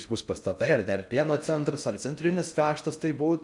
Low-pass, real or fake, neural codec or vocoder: 10.8 kHz; fake; codec, 16 kHz in and 24 kHz out, 0.6 kbps, FocalCodec, streaming, 4096 codes